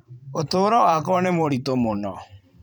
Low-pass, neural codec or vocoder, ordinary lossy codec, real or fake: 19.8 kHz; vocoder, 44.1 kHz, 128 mel bands every 512 samples, BigVGAN v2; none; fake